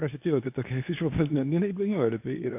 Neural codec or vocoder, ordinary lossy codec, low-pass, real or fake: codec, 24 kHz, 0.9 kbps, WavTokenizer, medium speech release version 2; AAC, 24 kbps; 3.6 kHz; fake